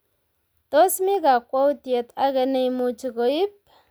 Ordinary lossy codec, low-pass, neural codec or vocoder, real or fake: none; none; none; real